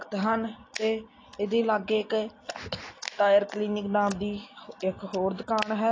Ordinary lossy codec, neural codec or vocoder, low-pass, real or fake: AAC, 32 kbps; none; 7.2 kHz; real